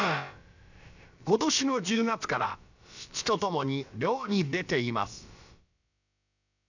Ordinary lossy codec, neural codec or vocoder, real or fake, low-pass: none; codec, 16 kHz, about 1 kbps, DyCAST, with the encoder's durations; fake; 7.2 kHz